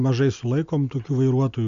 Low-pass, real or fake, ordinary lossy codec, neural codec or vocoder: 7.2 kHz; real; Opus, 64 kbps; none